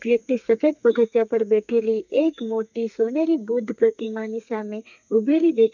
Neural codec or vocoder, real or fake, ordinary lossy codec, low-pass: codec, 32 kHz, 1.9 kbps, SNAC; fake; none; 7.2 kHz